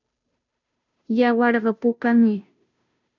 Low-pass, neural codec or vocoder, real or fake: 7.2 kHz; codec, 16 kHz, 0.5 kbps, FunCodec, trained on Chinese and English, 25 frames a second; fake